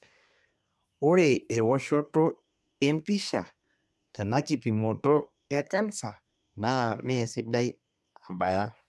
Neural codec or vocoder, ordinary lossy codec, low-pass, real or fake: codec, 24 kHz, 1 kbps, SNAC; none; none; fake